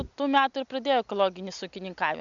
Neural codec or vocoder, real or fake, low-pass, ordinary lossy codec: none; real; 7.2 kHz; MP3, 64 kbps